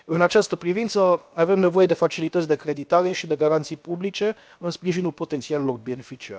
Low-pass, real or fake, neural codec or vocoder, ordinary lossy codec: none; fake; codec, 16 kHz, about 1 kbps, DyCAST, with the encoder's durations; none